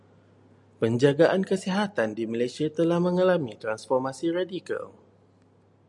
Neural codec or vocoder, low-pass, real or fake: none; 10.8 kHz; real